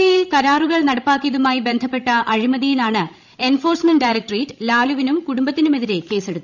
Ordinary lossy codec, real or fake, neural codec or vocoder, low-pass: none; fake; codec, 16 kHz, 16 kbps, FreqCodec, larger model; 7.2 kHz